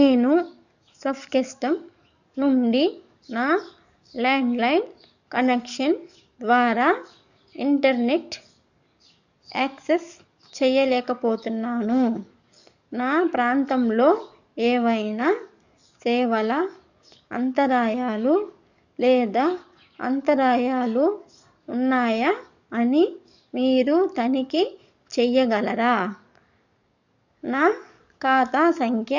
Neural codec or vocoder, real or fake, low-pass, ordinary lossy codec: codec, 44.1 kHz, 7.8 kbps, DAC; fake; 7.2 kHz; none